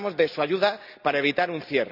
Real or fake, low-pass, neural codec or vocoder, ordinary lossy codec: real; 5.4 kHz; none; none